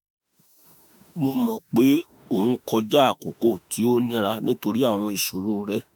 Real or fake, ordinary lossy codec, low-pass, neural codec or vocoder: fake; none; none; autoencoder, 48 kHz, 32 numbers a frame, DAC-VAE, trained on Japanese speech